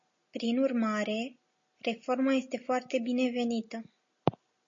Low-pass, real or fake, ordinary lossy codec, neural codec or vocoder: 7.2 kHz; real; MP3, 32 kbps; none